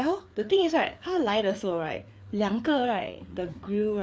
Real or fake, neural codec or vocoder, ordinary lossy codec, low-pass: fake; codec, 16 kHz, 4 kbps, FreqCodec, larger model; none; none